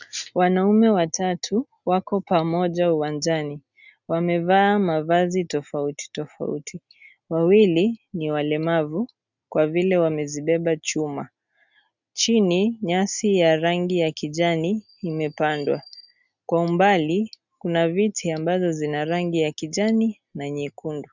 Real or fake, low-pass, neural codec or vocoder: real; 7.2 kHz; none